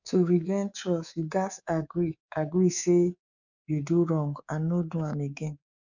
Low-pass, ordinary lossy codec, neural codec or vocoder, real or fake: 7.2 kHz; none; codec, 24 kHz, 3.1 kbps, DualCodec; fake